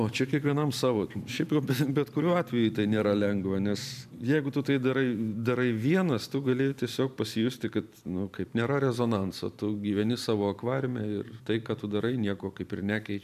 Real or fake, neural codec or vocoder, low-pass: fake; vocoder, 44.1 kHz, 128 mel bands every 512 samples, BigVGAN v2; 14.4 kHz